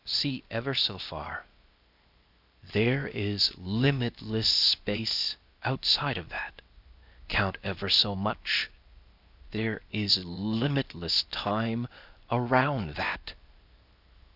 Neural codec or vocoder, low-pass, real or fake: codec, 16 kHz, 0.8 kbps, ZipCodec; 5.4 kHz; fake